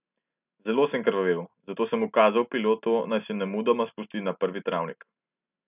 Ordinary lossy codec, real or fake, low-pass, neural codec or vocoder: none; real; 3.6 kHz; none